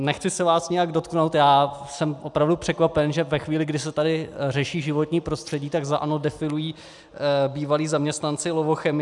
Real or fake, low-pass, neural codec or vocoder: real; 10.8 kHz; none